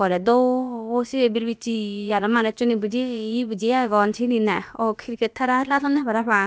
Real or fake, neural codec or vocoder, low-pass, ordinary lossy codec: fake; codec, 16 kHz, about 1 kbps, DyCAST, with the encoder's durations; none; none